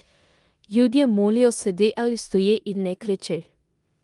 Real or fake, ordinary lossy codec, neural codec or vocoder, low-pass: fake; Opus, 32 kbps; codec, 16 kHz in and 24 kHz out, 0.9 kbps, LongCat-Audio-Codec, four codebook decoder; 10.8 kHz